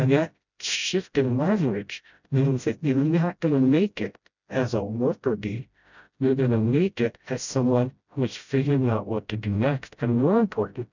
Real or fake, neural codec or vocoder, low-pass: fake; codec, 16 kHz, 0.5 kbps, FreqCodec, smaller model; 7.2 kHz